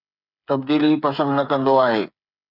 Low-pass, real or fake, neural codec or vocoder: 5.4 kHz; fake; codec, 16 kHz, 8 kbps, FreqCodec, smaller model